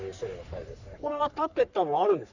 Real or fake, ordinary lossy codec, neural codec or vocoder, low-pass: fake; none; codec, 44.1 kHz, 3.4 kbps, Pupu-Codec; 7.2 kHz